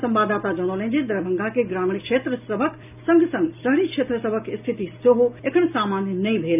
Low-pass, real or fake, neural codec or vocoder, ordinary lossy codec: 3.6 kHz; real; none; none